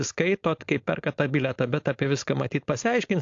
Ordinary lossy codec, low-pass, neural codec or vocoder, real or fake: AAC, 48 kbps; 7.2 kHz; codec, 16 kHz, 4.8 kbps, FACodec; fake